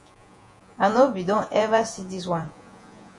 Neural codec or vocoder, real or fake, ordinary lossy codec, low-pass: vocoder, 48 kHz, 128 mel bands, Vocos; fake; AAC, 64 kbps; 10.8 kHz